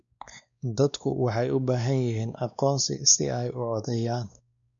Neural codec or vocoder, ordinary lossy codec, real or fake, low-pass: codec, 16 kHz, 2 kbps, X-Codec, WavLM features, trained on Multilingual LibriSpeech; none; fake; 7.2 kHz